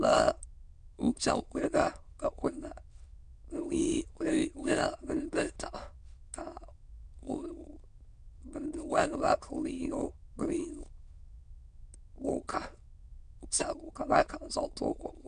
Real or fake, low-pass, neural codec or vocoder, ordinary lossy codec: fake; 9.9 kHz; autoencoder, 22.05 kHz, a latent of 192 numbers a frame, VITS, trained on many speakers; MP3, 96 kbps